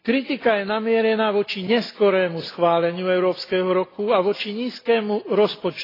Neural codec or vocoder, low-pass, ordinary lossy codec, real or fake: none; 5.4 kHz; AAC, 24 kbps; real